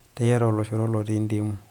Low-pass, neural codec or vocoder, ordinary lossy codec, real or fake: 19.8 kHz; vocoder, 48 kHz, 128 mel bands, Vocos; none; fake